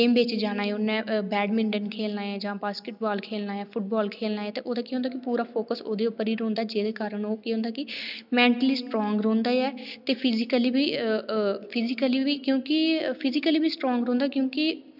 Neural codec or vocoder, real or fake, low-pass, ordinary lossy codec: none; real; 5.4 kHz; none